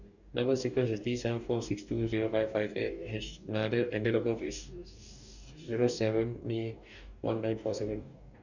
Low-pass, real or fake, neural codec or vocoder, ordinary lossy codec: 7.2 kHz; fake; codec, 44.1 kHz, 2.6 kbps, DAC; none